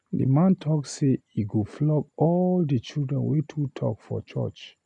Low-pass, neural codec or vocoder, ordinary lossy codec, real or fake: 10.8 kHz; none; none; real